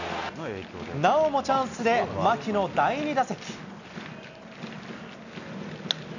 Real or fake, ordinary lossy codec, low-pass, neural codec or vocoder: real; none; 7.2 kHz; none